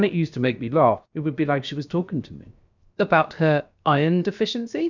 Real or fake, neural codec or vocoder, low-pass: fake; codec, 16 kHz, 0.7 kbps, FocalCodec; 7.2 kHz